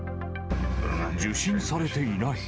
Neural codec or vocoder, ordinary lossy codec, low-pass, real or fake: none; none; none; real